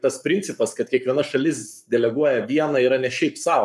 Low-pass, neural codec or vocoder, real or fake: 14.4 kHz; codec, 44.1 kHz, 7.8 kbps, Pupu-Codec; fake